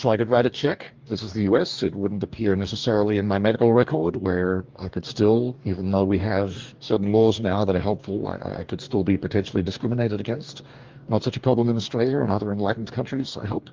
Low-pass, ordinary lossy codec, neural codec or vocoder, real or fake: 7.2 kHz; Opus, 32 kbps; codec, 44.1 kHz, 2.6 kbps, DAC; fake